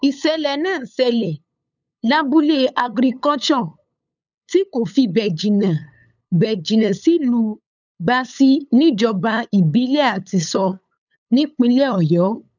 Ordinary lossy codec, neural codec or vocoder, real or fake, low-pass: none; codec, 16 kHz, 8 kbps, FunCodec, trained on LibriTTS, 25 frames a second; fake; 7.2 kHz